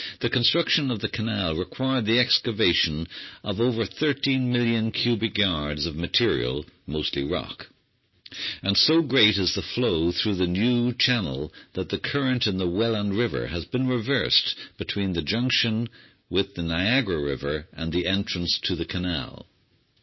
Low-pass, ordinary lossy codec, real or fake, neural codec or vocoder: 7.2 kHz; MP3, 24 kbps; real; none